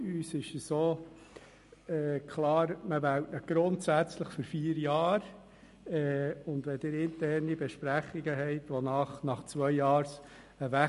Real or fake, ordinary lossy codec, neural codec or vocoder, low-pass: real; MP3, 48 kbps; none; 14.4 kHz